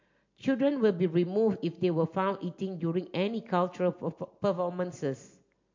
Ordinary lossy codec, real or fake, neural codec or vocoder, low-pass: MP3, 48 kbps; real; none; 7.2 kHz